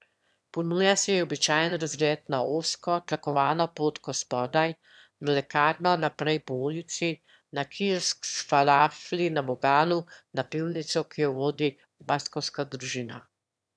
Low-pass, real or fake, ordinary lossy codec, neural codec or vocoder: none; fake; none; autoencoder, 22.05 kHz, a latent of 192 numbers a frame, VITS, trained on one speaker